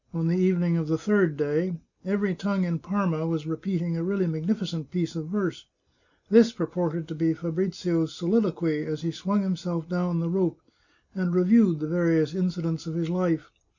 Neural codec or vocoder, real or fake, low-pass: none; real; 7.2 kHz